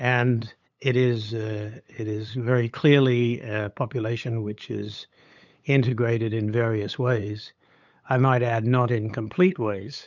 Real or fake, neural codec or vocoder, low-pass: fake; codec, 16 kHz, 8 kbps, FunCodec, trained on LibriTTS, 25 frames a second; 7.2 kHz